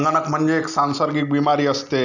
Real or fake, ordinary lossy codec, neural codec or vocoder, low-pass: real; none; none; 7.2 kHz